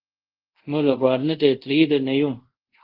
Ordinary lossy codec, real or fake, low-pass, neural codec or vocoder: Opus, 16 kbps; fake; 5.4 kHz; codec, 24 kHz, 0.5 kbps, DualCodec